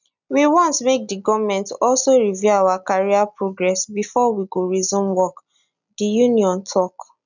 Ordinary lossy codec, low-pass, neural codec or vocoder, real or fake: none; 7.2 kHz; none; real